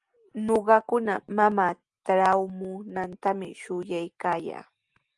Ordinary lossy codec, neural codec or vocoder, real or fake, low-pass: Opus, 32 kbps; none; real; 10.8 kHz